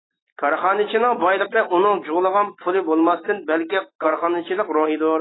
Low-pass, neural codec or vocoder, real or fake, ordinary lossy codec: 7.2 kHz; none; real; AAC, 16 kbps